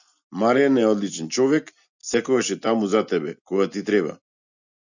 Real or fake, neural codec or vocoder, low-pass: real; none; 7.2 kHz